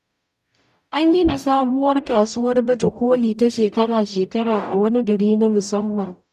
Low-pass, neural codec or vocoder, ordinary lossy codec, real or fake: 14.4 kHz; codec, 44.1 kHz, 0.9 kbps, DAC; none; fake